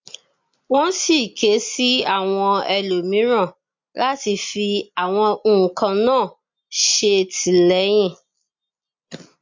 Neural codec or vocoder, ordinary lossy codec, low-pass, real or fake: none; MP3, 48 kbps; 7.2 kHz; real